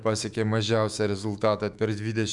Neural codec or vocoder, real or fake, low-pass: autoencoder, 48 kHz, 128 numbers a frame, DAC-VAE, trained on Japanese speech; fake; 10.8 kHz